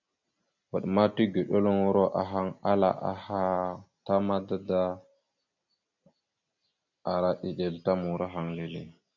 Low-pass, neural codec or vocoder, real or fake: 7.2 kHz; none; real